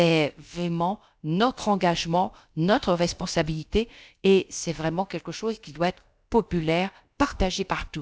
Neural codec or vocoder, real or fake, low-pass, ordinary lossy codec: codec, 16 kHz, about 1 kbps, DyCAST, with the encoder's durations; fake; none; none